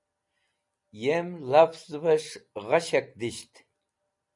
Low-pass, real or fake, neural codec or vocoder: 10.8 kHz; fake; vocoder, 44.1 kHz, 128 mel bands every 256 samples, BigVGAN v2